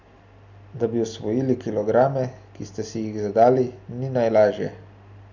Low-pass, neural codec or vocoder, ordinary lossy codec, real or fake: 7.2 kHz; none; none; real